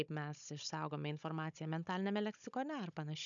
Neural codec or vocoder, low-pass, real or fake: codec, 16 kHz, 16 kbps, FunCodec, trained on Chinese and English, 50 frames a second; 7.2 kHz; fake